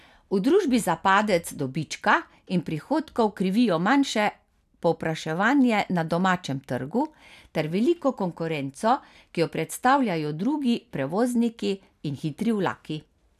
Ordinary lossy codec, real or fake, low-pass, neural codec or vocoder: none; real; 14.4 kHz; none